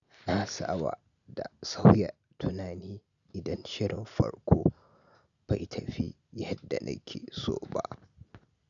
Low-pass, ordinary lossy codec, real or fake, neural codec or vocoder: 7.2 kHz; none; real; none